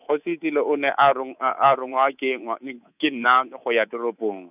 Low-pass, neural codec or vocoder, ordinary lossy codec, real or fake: 3.6 kHz; codec, 24 kHz, 3.1 kbps, DualCodec; none; fake